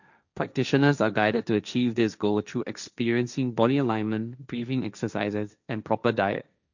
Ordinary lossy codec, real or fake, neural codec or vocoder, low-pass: none; fake; codec, 16 kHz, 1.1 kbps, Voila-Tokenizer; 7.2 kHz